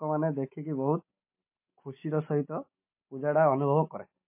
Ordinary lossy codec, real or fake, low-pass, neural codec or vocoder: MP3, 24 kbps; real; 3.6 kHz; none